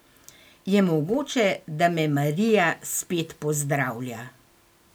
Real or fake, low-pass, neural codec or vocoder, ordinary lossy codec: real; none; none; none